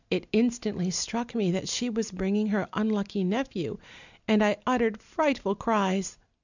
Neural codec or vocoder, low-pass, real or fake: none; 7.2 kHz; real